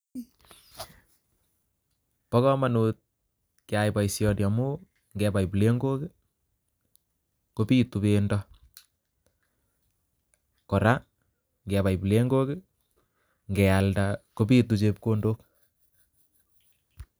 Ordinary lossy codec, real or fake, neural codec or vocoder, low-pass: none; real; none; none